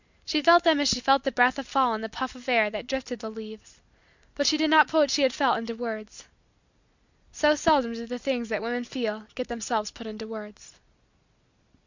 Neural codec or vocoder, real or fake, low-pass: none; real; 7.2 kHz